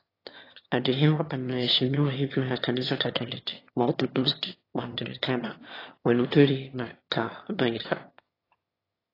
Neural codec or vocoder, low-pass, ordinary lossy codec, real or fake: autoencoder, 22.05 kHz, a latent of 192 numbers a frame, VITS, trained on one speaker; 5.4 kHz; AAC, 24 kbps; fake